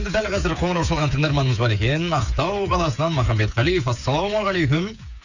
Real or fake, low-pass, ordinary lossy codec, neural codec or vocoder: fake; 7.2 kHz; none; codec, 16 kHz, 8 kbps, FreqCodec, smaller model